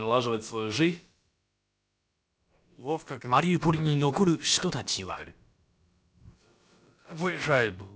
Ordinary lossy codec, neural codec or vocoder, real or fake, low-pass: none; codec, 16 kHz, about 1 kbps, DyCAST, with the encoder's durations; fake; none